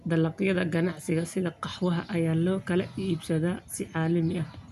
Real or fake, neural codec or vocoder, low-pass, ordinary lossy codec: real; none; 14.4 kHz; none